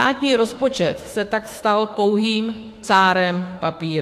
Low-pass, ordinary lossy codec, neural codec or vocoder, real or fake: 14.4 kHz; AAC, 64 kbps; autoencoder, 48 kHz, 32 numbers a frame, DAC-VAE, trained on Japanese speech; fake